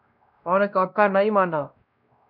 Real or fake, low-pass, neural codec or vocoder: fake; 5.4 kHz; codec, 16 kHz, 1 kbps, X-Codec, WavLM features, trained on Multilingual LibriSpeech